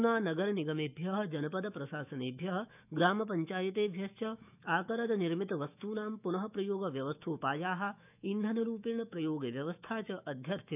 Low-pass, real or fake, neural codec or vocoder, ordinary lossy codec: 3.6 kHz; fake; autoencoder, 48 kHz, 128 numbers a frame, DAC-VAE, trained on Japanese speech; none